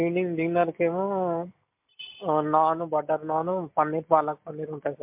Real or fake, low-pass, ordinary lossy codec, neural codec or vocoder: real; 3.6 kHz; MP3, 32 kbps; none